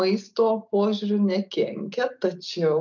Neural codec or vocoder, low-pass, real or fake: none; 7.2 kHz; real